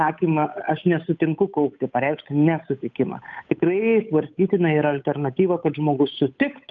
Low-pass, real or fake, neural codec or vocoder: 7.2 kHz; fake; codec, 16 kHz, 8 kbps, FunCodec, trained on Chinese and English, 25 frames a second